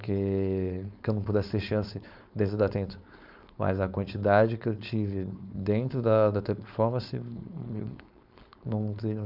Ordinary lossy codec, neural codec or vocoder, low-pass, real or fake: none; codec, 16 kHz, 4.8 kbps, FACodec; 5.4 kHz; fake